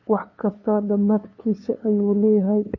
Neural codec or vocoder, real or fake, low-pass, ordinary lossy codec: codec, 16 kHz, 2 kbps, FunCodec, trained on LibriTTS, 25 frames a second; fake; 7.2 kHz; MP3, 64 kbps